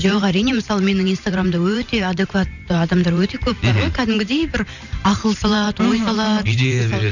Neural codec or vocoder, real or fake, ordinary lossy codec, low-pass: vocoder, 44.1 kHz, 128 mel bands every 512 samples, BigVGAN v2; fake; none; 7.2 kHz